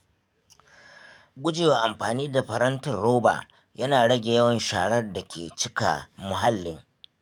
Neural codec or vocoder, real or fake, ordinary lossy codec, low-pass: codec, 44.1 kHz, 7.8 kbps, DAC; fake; none; 19.8 kHz